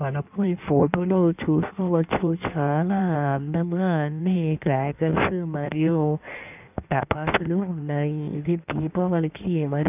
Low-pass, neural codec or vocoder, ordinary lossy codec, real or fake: 3.6 kHz; codec, 16 kHz in and 24 kHz out, 1.1 kbps, FireRedTTS-2 codec; none; fake